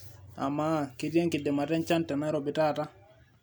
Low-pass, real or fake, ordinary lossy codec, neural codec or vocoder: none; real; none; none